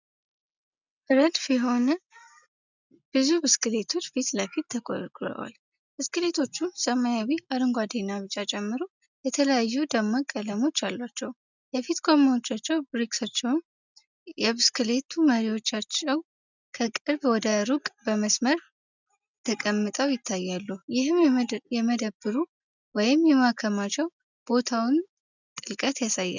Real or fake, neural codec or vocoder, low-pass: real; none; 7.2 kHz